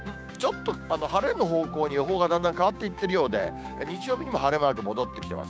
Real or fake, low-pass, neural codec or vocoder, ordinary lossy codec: fake; none; codec, 16 kHz, 6 kbps, DAC; none